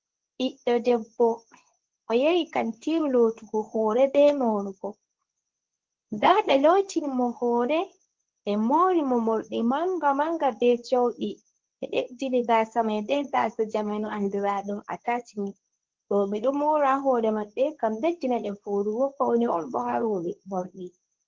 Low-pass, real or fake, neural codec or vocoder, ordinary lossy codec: 7.2 kHz; fake; codec, 24 kHz, 0.9 kbps, WavTokenizer, medium speech release version 2; Opus, 16 kbps